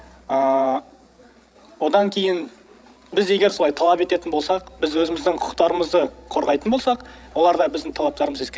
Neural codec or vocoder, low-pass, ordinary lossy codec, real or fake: codec, 16 kHz, 16 kbps, FreqCodec, larger model; none; none; fake